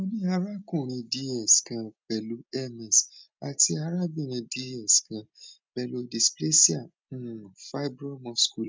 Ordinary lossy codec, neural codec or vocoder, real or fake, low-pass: none; none; real; none